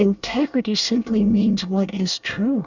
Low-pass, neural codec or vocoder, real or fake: 7.2 kHz; codec, 24 kHz, 1 kbps, SNAC; fake